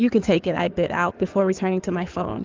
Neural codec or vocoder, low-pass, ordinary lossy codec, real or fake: codec, 16 kHz in and 24 kHz out, 2.2 kbps, FireRedTTS-2 codec; 7.2 kHz; Opus, 24 kbps; fake